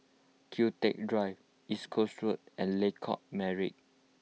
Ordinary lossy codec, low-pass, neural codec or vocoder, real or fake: none; none; none; real